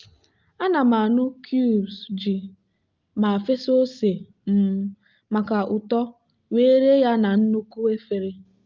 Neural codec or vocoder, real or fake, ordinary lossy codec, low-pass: none; real; Opus, 24 kbps; 7.2 kHz